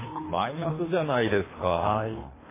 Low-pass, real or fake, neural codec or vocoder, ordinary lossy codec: 3.6 kHz; fake; codec, 24 kHz, 3 kbps, HILCodec; MP3, 16 kbps